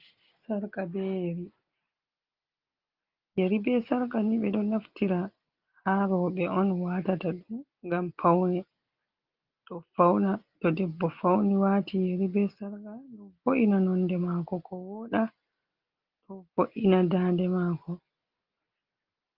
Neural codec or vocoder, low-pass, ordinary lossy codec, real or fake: none; 5.4 kHz; Opus, 32 kbps; real